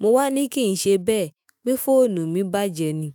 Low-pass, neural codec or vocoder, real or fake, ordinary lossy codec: none; autoencoder, 48 kHz, 128 numbers a frame, DAC-VAE, trained on Japanese speech; fake; none